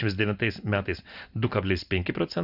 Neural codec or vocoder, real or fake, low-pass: none; real; 5.4 kHz